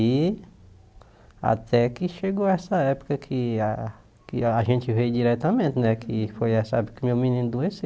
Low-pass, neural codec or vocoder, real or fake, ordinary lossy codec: none; none; real; none